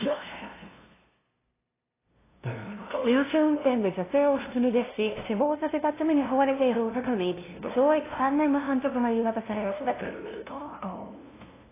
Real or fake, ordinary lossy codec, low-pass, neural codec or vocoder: fake; AAC, 16 kbps; 3.6 kHz; codec, 16 kHz, 0.5 kbps, FunCodec, trained on LibriTTS, 25 frames a second